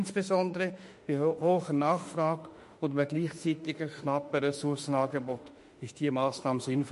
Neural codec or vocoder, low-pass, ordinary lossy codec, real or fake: autoencoder, 48 kHz, 32 numbers a frame, DAC-VAE, trained on Japanese speech; 14.4 kHz; MP3, 48 kbps; fake